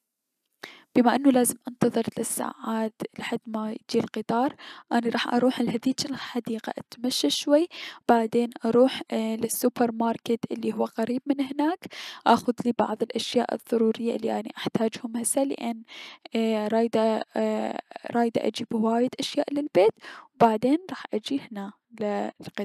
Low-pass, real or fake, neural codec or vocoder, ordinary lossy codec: 14.4 kHz; real; none; none